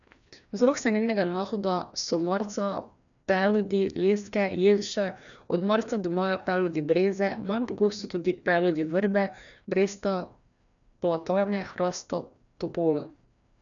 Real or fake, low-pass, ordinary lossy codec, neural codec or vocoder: fake; 7.2 kHz; none; codec, 16 kHz, 1 kbps, FreqCodec, larger model